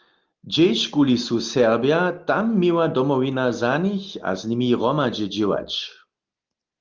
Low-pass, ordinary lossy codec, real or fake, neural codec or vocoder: 7.2 kHz; Opus, 32 kbps; real; none